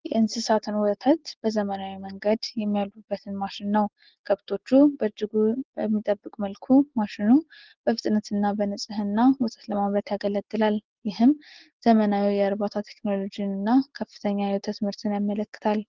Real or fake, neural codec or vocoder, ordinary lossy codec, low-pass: real; none; Opus, 16 kbps; 7.2 kHz